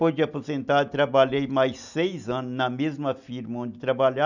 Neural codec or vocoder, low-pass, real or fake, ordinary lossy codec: none; 7.2 kHz; real; none